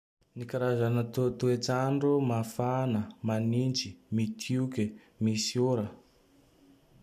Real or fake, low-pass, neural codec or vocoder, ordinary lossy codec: real; 14.4 kHz; none; none